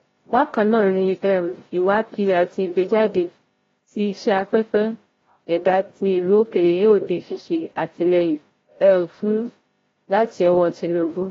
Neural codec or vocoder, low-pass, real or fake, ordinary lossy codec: codec, 16 kHz, 0.5 kbps, FreqCodec, larger model; 7.2 kHz; fake; AAC, 24 kbps